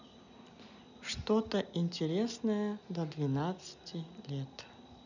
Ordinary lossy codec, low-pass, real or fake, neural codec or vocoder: none; 7.2 kHz; real; none